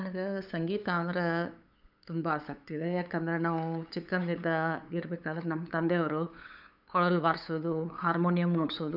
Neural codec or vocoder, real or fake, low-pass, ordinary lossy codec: codec, 16 kHz, 8 kbps, FunCodec, trained on LibriTTS, 25 frames a second; fake; 5.4 kHz; none